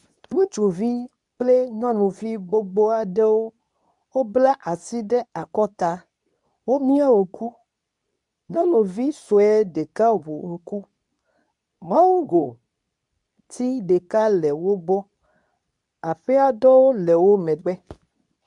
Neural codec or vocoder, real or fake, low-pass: codec, 24 kHz, 0.9 kbps, WavTokenizer, medium speech release version 2; fake; 10.8 kHz